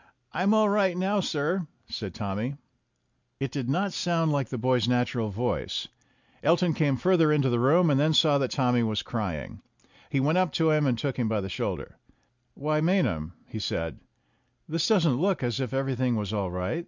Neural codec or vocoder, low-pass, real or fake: none; 7.2 kHz; real